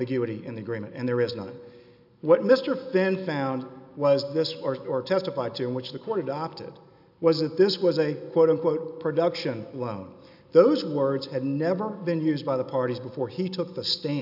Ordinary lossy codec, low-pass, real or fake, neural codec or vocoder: AAC, 48 kbps; 5.4 kHz; real; none